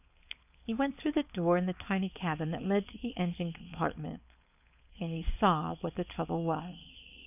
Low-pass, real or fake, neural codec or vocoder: 3.6 kHz; fake; codec, 16 kHz, 4.8 kbps, FACodec